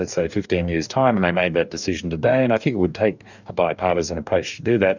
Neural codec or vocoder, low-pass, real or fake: codec, 44.1 kHz, 2.6 kbps, DAC; 7.2 kHz; fake